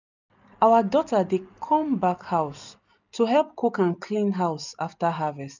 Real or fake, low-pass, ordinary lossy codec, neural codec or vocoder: real; 7.2 kHz; none; none